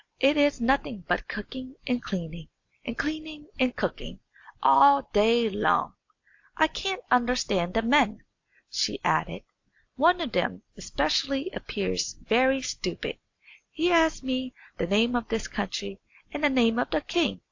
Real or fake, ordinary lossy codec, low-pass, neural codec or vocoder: real; AAC, 48 kbps; 7.2 kHz; none